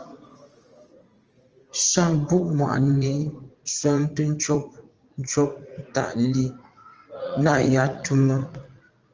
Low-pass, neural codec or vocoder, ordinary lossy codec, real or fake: 7.2 kHz; vocoder, 44.1 kHz, 80 mel bands, Vocos; Opus, 24 kbps; fake